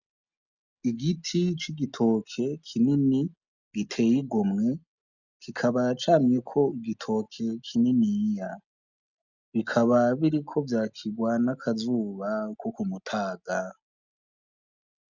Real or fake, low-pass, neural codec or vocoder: real; 7.2 kHz; none